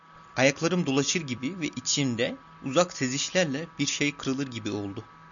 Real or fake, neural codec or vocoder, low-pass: real; none; 7.2 kHz